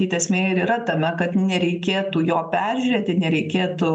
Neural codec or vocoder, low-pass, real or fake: none; 9.9 kHz; real